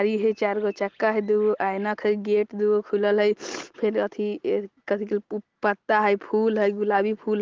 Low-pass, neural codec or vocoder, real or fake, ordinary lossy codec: 7.2 kHz; none; real; Opus, 16 kbps